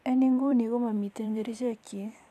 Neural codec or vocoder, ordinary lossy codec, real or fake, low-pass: vocoder, 44.1 kHz, 128 mel bands every 256 samples, BigVGAN v2; none; fake; 14.4 kHz